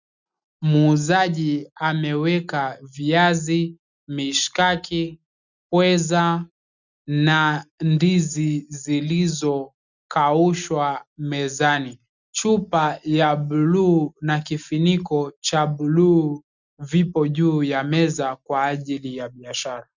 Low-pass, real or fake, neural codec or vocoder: 7.2 kHz; real; none